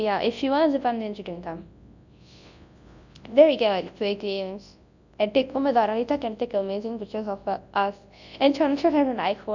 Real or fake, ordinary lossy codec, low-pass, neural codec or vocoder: fake; none; 7.2 kHz; codec, 24 kHz, 0.9 kbps, WavTokenizer, large speech release